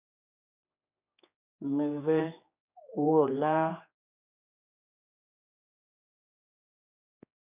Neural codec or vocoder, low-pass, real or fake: codec, 16 kHz, 2 kbps, X-Codec, HuBERT features, trained on general audio; 3.6 kHz; fake